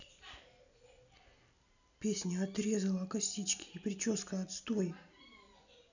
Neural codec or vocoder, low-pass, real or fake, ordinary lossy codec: none; 7.2 kHz; real; none